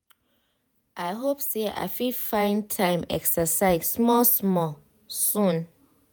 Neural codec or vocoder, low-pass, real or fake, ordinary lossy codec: vocoder, 48 kHz, 128 mel bands, Vocos; none; fake; none